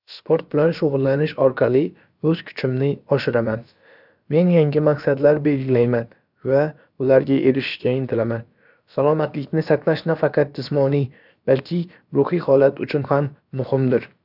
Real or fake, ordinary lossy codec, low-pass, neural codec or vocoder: fake; none; 5.4 kHz; codec, 16 kHz, about 1 kbps, DyCAST, with the encoder's durations